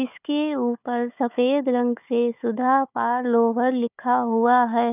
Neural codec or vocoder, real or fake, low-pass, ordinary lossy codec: codec, 16 kHz, 4 kbps, FunCodec, trained on Chinese and English, 50 frames a second; fake; 3.6 kHz; none